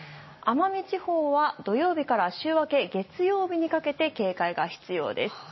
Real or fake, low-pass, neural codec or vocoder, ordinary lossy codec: real; 7.2 kHz; none; MP3, 24 kbps